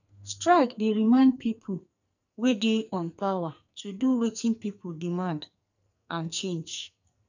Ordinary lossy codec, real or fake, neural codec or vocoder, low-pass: none; fake; codec, 44.1 kHz, 2.6 kbps, SNAC; 7.2 kHz